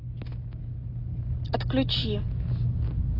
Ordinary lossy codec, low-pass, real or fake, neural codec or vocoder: AAC, 24 kbps; 5.4 kHz; real; none